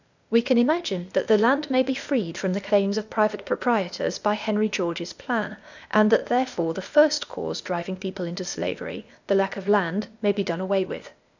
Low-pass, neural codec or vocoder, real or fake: 7.2 kHz; codec, 16 kHz, 0.8 kbps, ZipCodec; fake